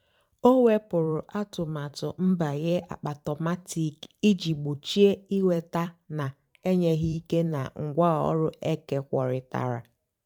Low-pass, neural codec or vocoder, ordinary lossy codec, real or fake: 19.8 kHz; vocoder, 44.1 kHz, 128 mel bands every 512 samples, BigVGAN v2; none; fake